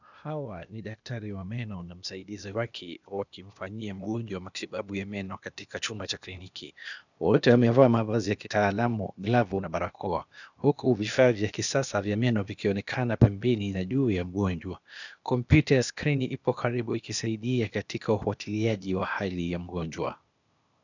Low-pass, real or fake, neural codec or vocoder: 7.2 kHz; fake; codec, 16 kHz, 0.8 kbps, ZipCodec